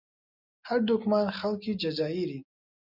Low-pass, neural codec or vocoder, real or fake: 5.4 kHz; none; real